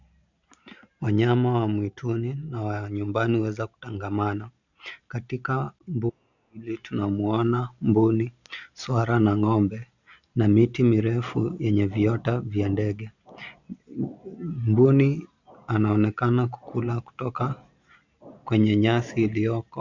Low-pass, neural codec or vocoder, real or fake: 7.2 kHz; none; real